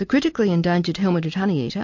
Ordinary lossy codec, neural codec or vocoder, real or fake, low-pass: MP3, 48 kbps; none; real; 7.2 kHz